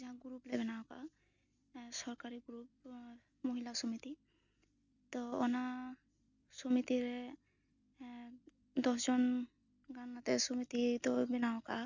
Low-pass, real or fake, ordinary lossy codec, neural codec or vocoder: 7.2 kHz; real; none; none